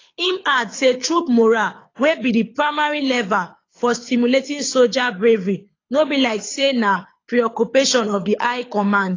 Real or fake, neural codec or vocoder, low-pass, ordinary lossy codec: fake; codec, 24 kHz, 6 kbps, HILCodec; 7.2 kHz; AAC, 32 kbps